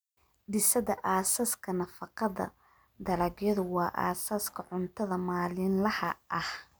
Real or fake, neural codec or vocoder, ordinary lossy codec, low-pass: real; none; none; none